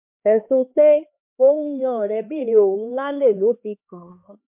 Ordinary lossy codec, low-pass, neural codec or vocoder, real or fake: MP3, 32 kbps; 3.6 kHz; codec, 16 kHz, 2 kbps, X-Codec, HuBERT features, trained on LibriSpeech; fake